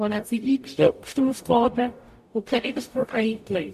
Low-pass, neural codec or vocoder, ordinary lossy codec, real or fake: 14.4 kHz; codec, 44.1 kHz, 0.9 kbps, DAC; MP3, 64 kbps; fake